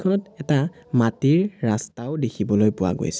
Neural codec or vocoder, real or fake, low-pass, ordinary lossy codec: none; real; none; none